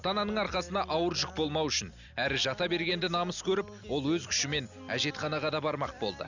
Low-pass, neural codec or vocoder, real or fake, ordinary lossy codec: 7.2 kHz; none; real; none